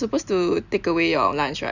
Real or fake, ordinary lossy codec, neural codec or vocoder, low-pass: real; none; none; 7.2 kHz